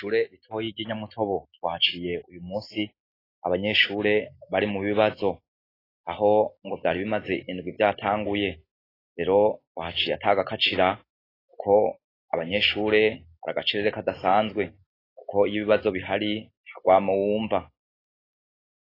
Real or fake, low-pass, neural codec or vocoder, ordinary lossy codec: real; 5.4 kHz; none; AAC, 24 kbps